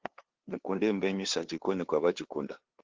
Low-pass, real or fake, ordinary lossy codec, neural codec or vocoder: 7.2 kHz; fake; Opus, 16 kbps; codec, 16 kHz, 2 kbps, FunCodec, trained on LibriTTS, 25 frames a second